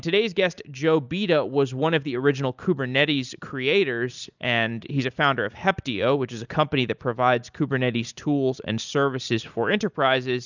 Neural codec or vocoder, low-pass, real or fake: none; 7.2 kHz; real